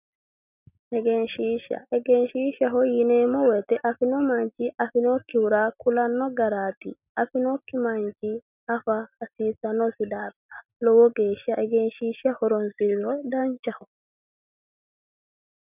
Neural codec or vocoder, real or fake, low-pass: none; real; 3.6 kHz